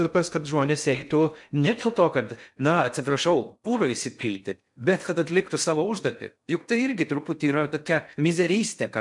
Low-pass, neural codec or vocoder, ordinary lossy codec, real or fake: 10.8 kHz; codec, 16 kHz in and 24 kHz out, 0.6 kbps, FocalCodec, streaming, 2048 codes; MP3, 96 kbps; fake